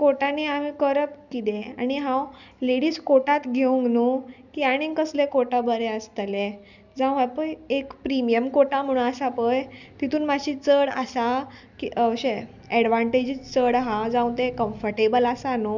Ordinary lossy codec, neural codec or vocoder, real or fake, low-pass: none; none; real; 7.2 kHz